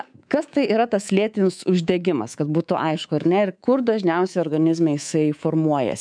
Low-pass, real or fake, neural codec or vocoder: 9.9 kHz; fake; codec, 24 kHz, 3.1 kbps, DualCodec